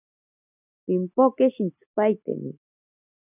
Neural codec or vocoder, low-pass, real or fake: none; 3.6 kHz; real